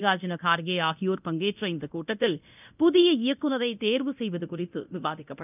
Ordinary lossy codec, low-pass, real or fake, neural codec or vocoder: none; 3.6 kHz; fake; codec, 24 kHz, 0.9 kbps, DualCodec